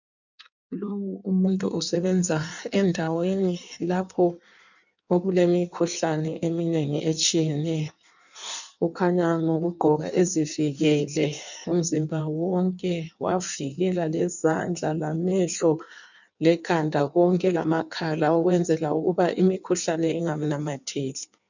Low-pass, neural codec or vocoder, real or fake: 7.2 kHz; codec, 16 kHz in and 24 kHz out, 1.1 kbps, FireRedTTS-2 codec; fake